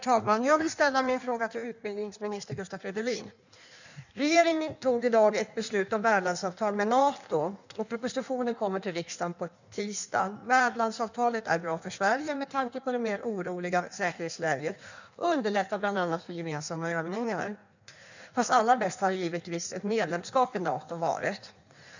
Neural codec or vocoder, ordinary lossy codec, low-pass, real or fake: codec, 16 kHz in and 24 kHz out, 1.1 kbps, FireRedTTS-2 codec; none; 7.2 kHz; fake